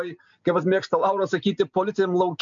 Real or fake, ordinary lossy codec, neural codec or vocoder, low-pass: real; MP3, 64 kbps; none; 7.2 kHz